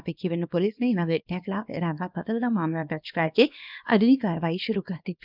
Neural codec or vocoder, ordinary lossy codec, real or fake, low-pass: codec, 24 kHz, 0.9 kbps, WavTokenizer, small release; none; fake; 5.4 kHz